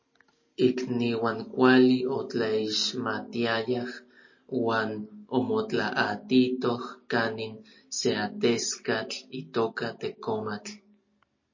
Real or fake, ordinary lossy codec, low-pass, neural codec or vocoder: real; MP3, 32 kbps; 7.2 kHz; none